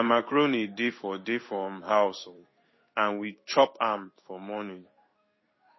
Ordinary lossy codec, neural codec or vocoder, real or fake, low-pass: MP3, 24 kbps; codec, 16 kHz in and 24 kHz out, 1 kbps, XY-Tokenizer; fake; 7.2 kHz